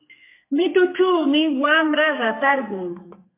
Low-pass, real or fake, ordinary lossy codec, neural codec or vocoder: 3.6 kHz; fake; MP3, 24 kbps; codec, 32 kHz, 1.9 kbps, SNAC